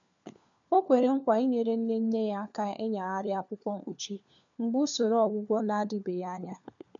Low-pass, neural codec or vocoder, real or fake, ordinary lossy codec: 7.2 kHz; codec, 16 kHz, 4 kbps, FunCodec, trained on LibriTTS, 50 frames a second; fake; none